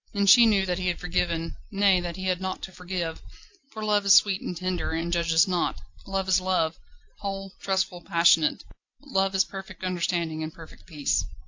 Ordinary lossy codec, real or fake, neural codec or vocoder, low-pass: AAC, 48 kbps; real; none; 7.2 kHz